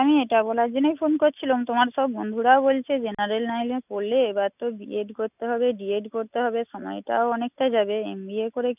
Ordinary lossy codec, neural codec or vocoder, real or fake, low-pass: none; none; real; 3.6 kHz